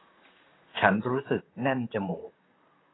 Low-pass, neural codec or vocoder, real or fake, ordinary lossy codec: 7.2 kHz; vocoder, 44.1 kHz, 128 mel bands, Pupu-Vocoder; fake; AAC, 16 kbps